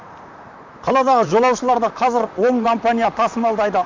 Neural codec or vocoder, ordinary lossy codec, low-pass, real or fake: vocoder, 44.1 kHz, 128 mel bands, Pupu-Vocoder; MP3, 64 kbps; 7.2 kHz; fake